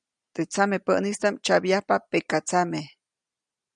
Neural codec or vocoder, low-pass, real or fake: none; 9.9 kHz; real